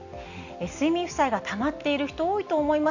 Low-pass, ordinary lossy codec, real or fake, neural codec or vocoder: 7.2 kHz; none; real; none